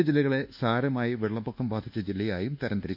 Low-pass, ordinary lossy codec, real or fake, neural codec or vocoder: 5.4 kHz; none; fake; codec, 24 kHz, 1.2 kbps, DualCodec